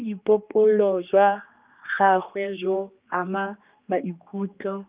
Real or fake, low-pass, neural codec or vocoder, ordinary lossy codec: fake; 3.6 kHz; codec, 16 kHz, 2 kbps, X-Codec, HuBERT features, trained on general audio; Opus, 32 kbps